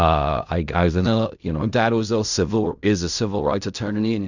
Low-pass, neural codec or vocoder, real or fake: 7.2 kHz; codec, 16 kHz in and 24 kHz out, 0.4 kbps, LongCat-Audio-Codec, fine tuned four codebook decoder; fake